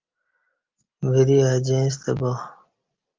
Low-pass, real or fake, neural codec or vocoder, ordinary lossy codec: 7.2 kHz; real; none; Opus, 32 kbps